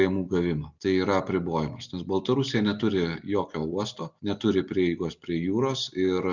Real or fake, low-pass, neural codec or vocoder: real; 7.2 kHz; none